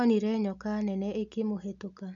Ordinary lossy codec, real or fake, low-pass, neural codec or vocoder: none; real; 7.2 kHz; none